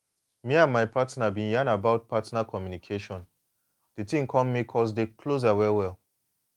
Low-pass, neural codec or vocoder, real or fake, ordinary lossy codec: 19.8 kHz; autoencoder, 48 kHz, 128 numbers a frame, DAC-VAE, trained on Japanese speech; fake; Opus, 32 kbps